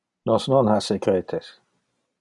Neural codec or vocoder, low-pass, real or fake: none; 10.8 kHz; real